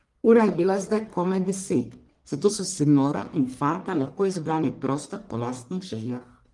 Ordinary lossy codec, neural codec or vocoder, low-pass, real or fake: Opus, 24 kbps; codec, 44.1 kHz, 1.7 kbps, Pupu-Codec; 10.8 kHz; fake